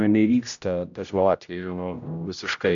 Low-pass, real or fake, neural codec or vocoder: 7.2 kHz; fake; codec, 16 kHz, 0.5 kbps, X-Codec, HuBERT features, trained on general audio